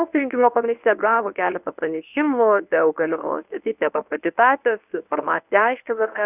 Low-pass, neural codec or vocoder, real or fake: 3.6 kHz; codec, 24 kHz, 0.9 kbps, WavTokenizer, medium speech release version 1; fake